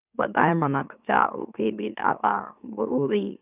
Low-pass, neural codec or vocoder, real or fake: 3.6 kHz; autoencoder, 44.1 kHz, a latent of 192 numbers a frame, MeloTTS; fake